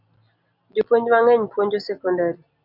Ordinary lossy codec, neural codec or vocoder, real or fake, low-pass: AAC, 48 kbps; none; real; 5.4 kHz